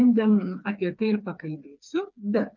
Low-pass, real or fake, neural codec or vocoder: 7.2 kHz; fake; codec, 16 kHz, 4 kbps, FreqCodec, smaller model